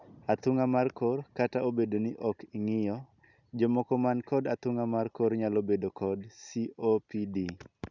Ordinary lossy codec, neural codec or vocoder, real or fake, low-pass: none; none; real; 7.2 kHz